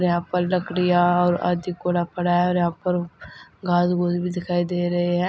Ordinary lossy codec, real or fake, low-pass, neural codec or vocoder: none; real; none; none